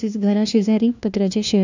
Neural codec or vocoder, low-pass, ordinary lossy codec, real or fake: codec, 16 kHz, 1 kbps, FunCodec, trained on LibriTTS, 50 frames a second; 7.2 kHz; none; fake